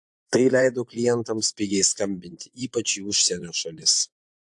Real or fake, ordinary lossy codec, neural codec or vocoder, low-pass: fake; AAC, 64 kbps; vocoder, 24 kHz, 100 mel bands, Vocos; 10.8 kHz